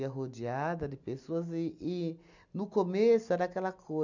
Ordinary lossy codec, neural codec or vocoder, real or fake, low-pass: none; none; real; 7.2 kHz